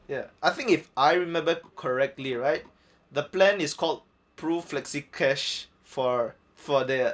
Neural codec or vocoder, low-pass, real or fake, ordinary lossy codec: none; none; real; none